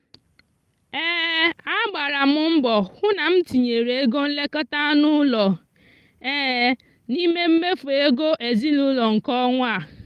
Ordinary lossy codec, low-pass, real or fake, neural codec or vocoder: Opus, 32 kbps; 14.4 kHz; real; none